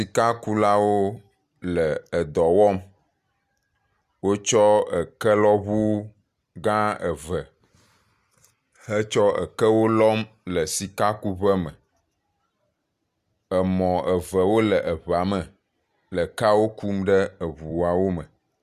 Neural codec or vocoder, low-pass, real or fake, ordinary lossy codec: none; 14.4 kHz; real; Opus, 64 kbps